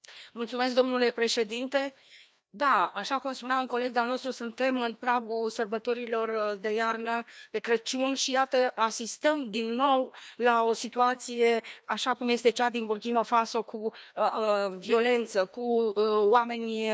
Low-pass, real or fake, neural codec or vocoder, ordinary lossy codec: none; fake; codec, 16 kHz, 1 kbps, FreqCodec, larger model; none